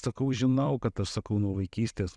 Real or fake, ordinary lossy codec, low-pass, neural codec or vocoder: fake; Opus, 64 kbps; 10.8 kHz; vocoder, 44.1 kHz, 128 mel bands every 256 samples, BigVGAN v2